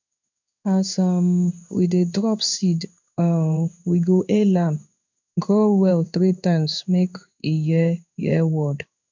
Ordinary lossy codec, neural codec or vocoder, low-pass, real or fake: none; codec, 16 kHz in and 24 kHz out, 1 kbps, XY-Tokenizer; 7.2 kHz; fake